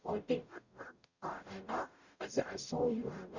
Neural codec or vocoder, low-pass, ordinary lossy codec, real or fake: codec, 44.1 kHz, 0.9 kbps, DAC; 7.2 kHz; Opus, 64 kbps; fake